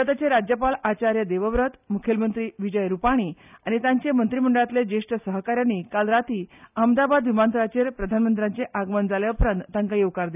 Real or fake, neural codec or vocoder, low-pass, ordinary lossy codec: real; none; 3.6 kHz; none